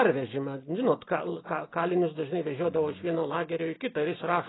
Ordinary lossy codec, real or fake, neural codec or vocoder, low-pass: AAC, 16 kbps; real; none; 7.2 kHz